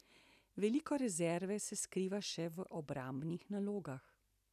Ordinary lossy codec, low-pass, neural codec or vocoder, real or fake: none; 14.4 kHz; none; real